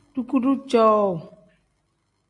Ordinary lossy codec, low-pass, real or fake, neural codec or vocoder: MP3, 64 kbps; 10.8 kHz; real; none